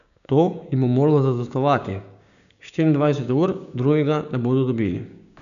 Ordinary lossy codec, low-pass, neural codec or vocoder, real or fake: none; 7.2 kHz; codec, 16 kHz, 6 kbps, DAC; fake